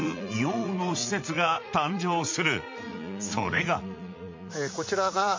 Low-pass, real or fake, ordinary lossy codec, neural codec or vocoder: 7.2 kHz; fake; MP3, 48 kbps; vocoder, 44.1 kHz, 80 mel bands, Vocos